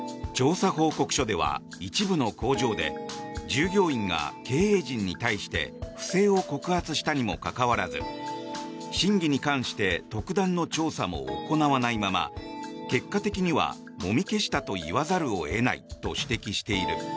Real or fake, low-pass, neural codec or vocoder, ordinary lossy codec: real; none; none; none